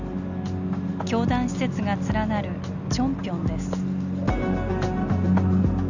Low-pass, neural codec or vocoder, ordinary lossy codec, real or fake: 7.2 kHz; none; none; real